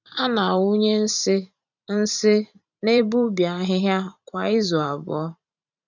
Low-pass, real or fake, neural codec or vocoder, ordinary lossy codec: 7.2 kHz; fake; vocoder, 44.1 kHz, 128 mel bands every 512 samples, BigVGAN v2; none